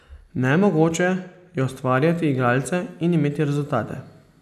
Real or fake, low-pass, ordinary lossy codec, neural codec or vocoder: real; 14.4 kHz; none; none